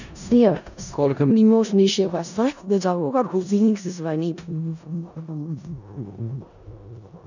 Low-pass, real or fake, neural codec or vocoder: 7.2 kHz; fake; codec, 16 kHz in and 24 kHz out, 0.4 kbps, LongCat-Audio-Codec, four codebook decoder